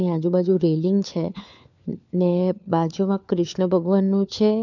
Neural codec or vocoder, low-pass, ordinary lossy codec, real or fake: codec, 16 kHz, 4 kbps, FunCodec, trained on LibriTTS, 50 frames a second; 7.2 kHz; none; fake